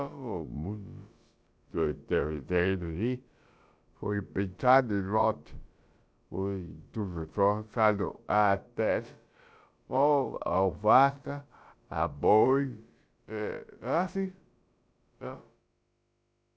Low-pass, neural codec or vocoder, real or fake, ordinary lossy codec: none; codec, 16 kHz, about 1 kbps, DyCAST, with the encoder's durations; fake; none